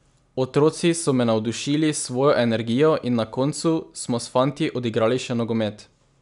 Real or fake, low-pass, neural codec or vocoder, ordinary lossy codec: real; 10.8 kHz; none; none